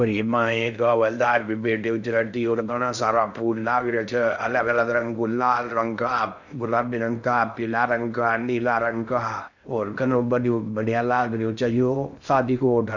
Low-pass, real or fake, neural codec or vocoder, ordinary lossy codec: 7.2 kHz; fake; codec, 16 kHz in and 24 kHz out, 0.6 kbps, FocalCodec, streaming, 2048 codes; none